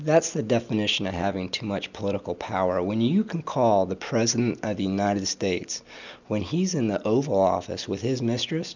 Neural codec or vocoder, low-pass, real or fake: none; 7.2 kHz; real